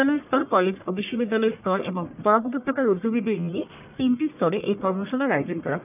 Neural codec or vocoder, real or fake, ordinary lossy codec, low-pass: codec, 44.1 kHz, 1.7 kbps, Pupu-Codec; fake; none; 3.6 kHz